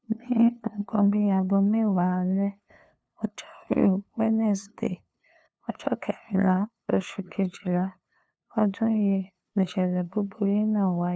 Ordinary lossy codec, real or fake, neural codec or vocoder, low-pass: none; fake; codec, 16 kHz, 2 kbps, FunCodec, trained on LibriTTS, 25 frames a second; none